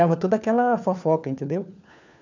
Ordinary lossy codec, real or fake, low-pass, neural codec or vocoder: none; fake; 7.2 kHz; codec, 16 kHz, 4 kbps, FunCodec, trained on LibriTTS, 50 frames a second